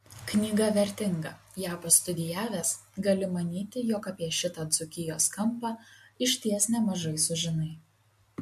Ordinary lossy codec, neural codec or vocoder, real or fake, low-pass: MP3, 64 kbps; none; real; 14.4 kHz